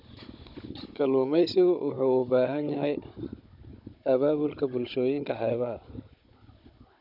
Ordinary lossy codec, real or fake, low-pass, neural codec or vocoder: none; fake; 5.4 kHz; codec, 16 kHz, 16 kbps, FunCodec, trained on Chinese and English, 50 frames a second